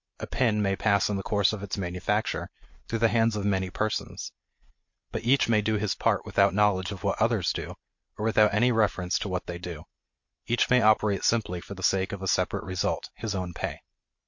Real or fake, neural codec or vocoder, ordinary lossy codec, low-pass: real; none; MP3, 48 kbps; 7.2 kHz